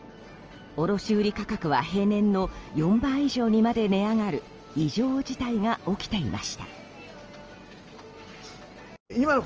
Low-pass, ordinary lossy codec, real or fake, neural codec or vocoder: 7.2 kHz; Opus, 24 kbps; real; none